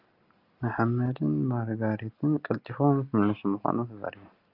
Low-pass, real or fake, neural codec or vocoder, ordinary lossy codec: 5.4 kHz; real; none; Opus, 24 kbps